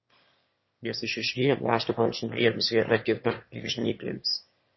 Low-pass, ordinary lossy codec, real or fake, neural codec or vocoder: 7.2 kHz; MP3, 24 kbps; fake; autoencoder, 22.05 kHz, a latent of 192 numbers a frame, VITS, trained on one speaker